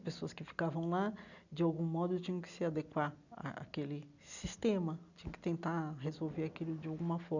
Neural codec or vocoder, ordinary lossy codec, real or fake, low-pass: none; none; real; 7.2 kHz